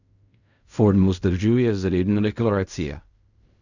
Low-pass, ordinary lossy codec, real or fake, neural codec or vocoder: 7.2 kHz; none; fake; codec, 16 kHz in and 24 kHz out, 0.4 kbps, LongCat-Audio-Codec, fine tuned four codebook decoder